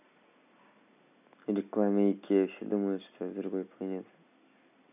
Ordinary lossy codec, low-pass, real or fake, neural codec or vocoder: none; 3.6 kHz; real; none